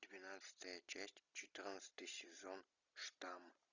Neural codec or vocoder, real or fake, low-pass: none; real; 7.2 kHz